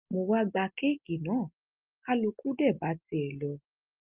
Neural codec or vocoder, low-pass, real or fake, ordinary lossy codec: none; 3.6 kHz; real; Opus, 32 kbps